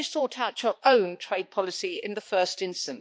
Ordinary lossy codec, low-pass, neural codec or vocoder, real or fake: none; none; codec, 16 kHz, 2 kbps, X-Codec, HuBERT features, trained on balanced general audio; fake